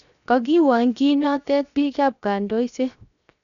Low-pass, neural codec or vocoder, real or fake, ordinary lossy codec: 7.2 kHz; codec, 16 kHz, 0.7 kbps, FocalCodec; fake; none